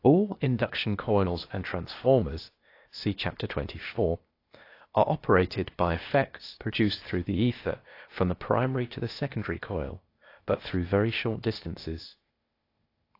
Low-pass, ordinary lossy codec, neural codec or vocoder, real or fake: 5.4 kHz; AAC, 32 kbps; codec, 16 kHz, 0.8 kbps, ZipCodec; fake